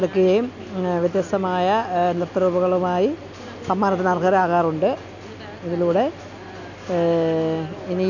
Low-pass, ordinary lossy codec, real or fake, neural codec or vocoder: 7.2 kHz; none; real; none